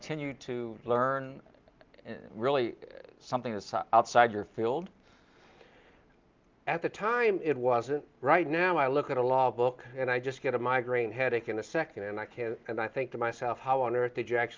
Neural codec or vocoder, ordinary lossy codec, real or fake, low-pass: none; Opus, 24 kbps; real; 7.2 kHz